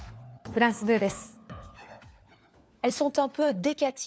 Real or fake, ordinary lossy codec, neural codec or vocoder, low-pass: fake; none; codec, 16 kHz, 4 kbps, FunCodec, trained on LibriTTS, 50 frames a second; none